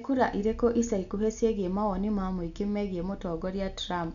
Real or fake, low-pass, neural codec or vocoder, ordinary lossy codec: real; 7.2 kHz; none; none